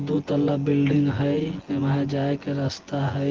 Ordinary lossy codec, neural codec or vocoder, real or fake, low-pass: Opus, 16 kbps; vocoder, 24 kHz, 100 mel bands, Vocos; fake; 7.2 kHz